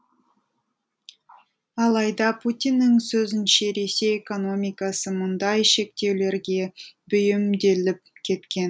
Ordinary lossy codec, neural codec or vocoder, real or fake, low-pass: none; none; real; none